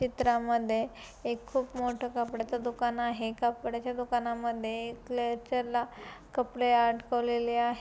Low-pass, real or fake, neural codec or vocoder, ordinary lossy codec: none; real; none; none